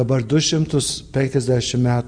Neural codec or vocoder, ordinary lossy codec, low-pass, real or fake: none; Opus, 64 kbps; 9.9 kHz; real